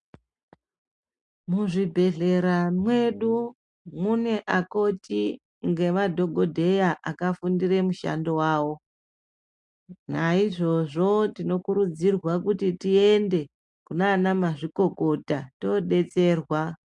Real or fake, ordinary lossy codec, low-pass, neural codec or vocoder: real; MP3, 64 kbps; 10.8 kHz; none